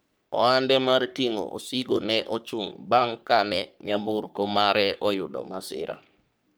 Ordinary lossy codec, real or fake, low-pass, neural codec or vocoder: none; fake; none; codec, 44.1 kHz, 3.4 kbps, Pupu-Codec